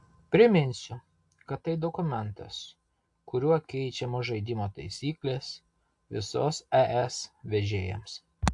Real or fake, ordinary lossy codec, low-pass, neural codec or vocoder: real; AAC, 64 kbps; 10.8 kHz; none